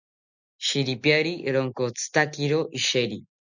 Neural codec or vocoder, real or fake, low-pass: none; real; 7.2 kHz